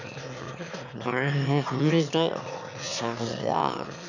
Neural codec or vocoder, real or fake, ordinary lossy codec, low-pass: autoencoder, 22.05 kHz, a latent of 192 numbers a frame, VITS, trained on one speaker; fake; none; 7.2 kHz